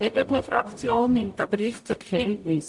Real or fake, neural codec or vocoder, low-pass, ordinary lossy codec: fake; codec, 44.1 kHz, 0.9 kbps, DAC; 10.8 kHz; AAC, 64 kbps